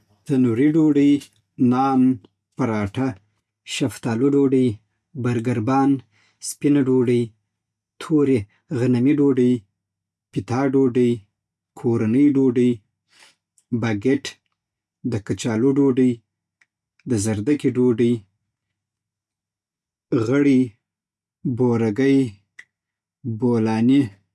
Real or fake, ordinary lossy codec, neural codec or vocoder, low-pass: real; none; none; none